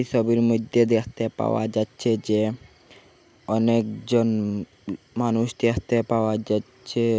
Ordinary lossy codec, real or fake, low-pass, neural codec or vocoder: none; real; none; none